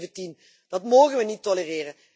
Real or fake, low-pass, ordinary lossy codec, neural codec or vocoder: real; none; none; none